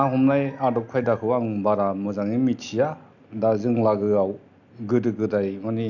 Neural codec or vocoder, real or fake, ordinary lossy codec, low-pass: none; real; none; 7.2 kHz